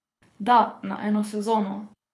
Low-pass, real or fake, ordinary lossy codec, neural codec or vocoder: none; fake; none; codec, 24 kHz, 6 kbps, HILCodec